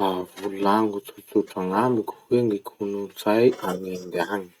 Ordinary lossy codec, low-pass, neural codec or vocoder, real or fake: Opus, 64 kbps; 19.8 kHz; none; real